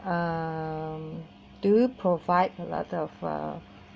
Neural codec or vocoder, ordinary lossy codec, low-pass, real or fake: none; none; none; real